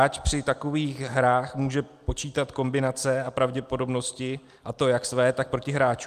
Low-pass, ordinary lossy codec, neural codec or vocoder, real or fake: 14.4 kHz; Opus, 32 kbps; none; real